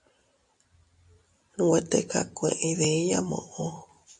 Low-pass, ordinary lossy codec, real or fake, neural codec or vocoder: 10.8 kHz; MP3, 64 kbps; real; none